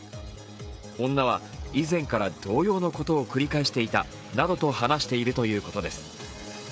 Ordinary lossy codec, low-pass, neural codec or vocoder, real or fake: none; none; codec, 16 kHz, 16 kbps, FreqCodec, smaller model; fake